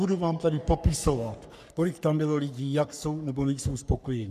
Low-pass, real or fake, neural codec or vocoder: 14.4 kHz; fake; codec, 44.1 kHz, 3.4 kbps, Pupu-Codec